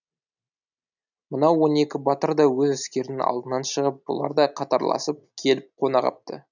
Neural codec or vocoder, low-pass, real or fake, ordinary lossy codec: none; 7.2 kHz; real; none